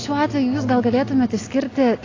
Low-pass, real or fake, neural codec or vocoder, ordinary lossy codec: 7.2 kHz; real; none; AAC, 32 kbps